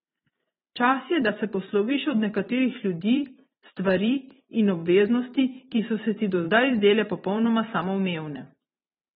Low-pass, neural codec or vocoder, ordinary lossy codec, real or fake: 19.8 kHz; vocoder, 44.1 kHz, 128 mel bands every 512 samples, BigVGAN v2; AAC, 16 kbps; fake